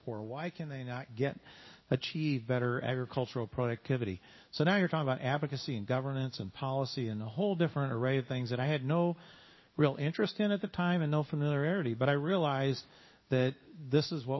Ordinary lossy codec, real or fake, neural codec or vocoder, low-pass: MP3, 24 kbps; fake; codec, 16 kHz in and 24 kHz out, 1 kbps, XY-Tokenizer; 7.2 kHz